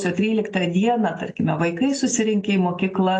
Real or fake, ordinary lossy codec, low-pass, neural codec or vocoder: real; AAC, 32 kbps; 9.9 kHz; none